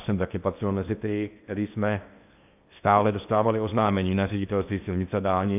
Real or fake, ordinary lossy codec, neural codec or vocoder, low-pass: fake; AAC, 32 kbps; codec, 16 kHz in and 24 kHz out, 0.8 kbps, FocalCodec, streaming, 65536 codes; 3.6 kHz